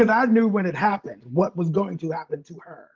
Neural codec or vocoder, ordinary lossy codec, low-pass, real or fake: none; Opus, 32 kbps; 7.2 kHz; real